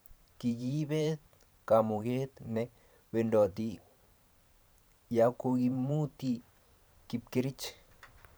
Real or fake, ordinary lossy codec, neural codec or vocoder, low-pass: fake; none; vocoder, 44.1 kHz, 128 mel bands every 512 samples, BigVGAN v2; none